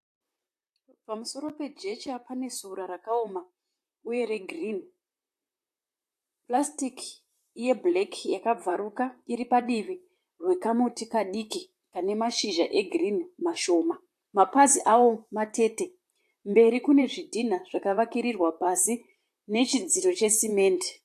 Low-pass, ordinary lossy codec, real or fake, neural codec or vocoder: 14.4 kHz; AAC, 64 kbps; fake; vocoder, 44.1 kHz, 128 mel bands, Pupu-Vocoder